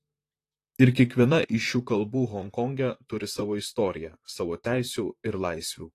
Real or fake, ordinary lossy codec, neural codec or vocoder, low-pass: fake; AAC, 48 kbps; vocoder, 44.1 kHz, 128 mel bands, Pupu-Vocoder; 14.4 kHz